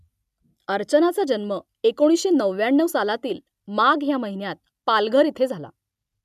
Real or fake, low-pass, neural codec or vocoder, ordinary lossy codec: real; 14.4 kHz; none; none